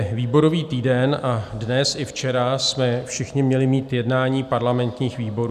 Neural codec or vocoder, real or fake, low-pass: none; real; 14.4 kHz